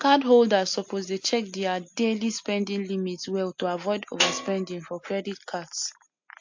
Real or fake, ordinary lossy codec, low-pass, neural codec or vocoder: real; MP3, 48 kbps; 7.2 kHz; none